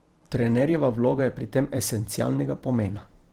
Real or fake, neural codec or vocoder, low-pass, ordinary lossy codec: fake; vocoder, 44.1 kHz, 128 mel bands every 512 samples, BigVGAN v2; 19.8 kHz; Opus, 16 kbps